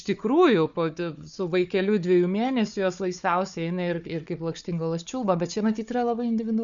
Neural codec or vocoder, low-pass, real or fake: codec, 16 kHz, 4 kbps, FunCodec, trained on Chinese and English, 50 frames a second; 7.2 kHz; fake